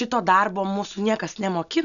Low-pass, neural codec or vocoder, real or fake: 7.2 kHz; none; real